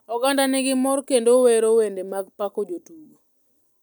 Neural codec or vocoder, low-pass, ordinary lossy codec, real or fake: vocoder, 44.1 kHz, 128 mel bands every 256 samples, BigVGAN v2; 19.8 kHz; none; fake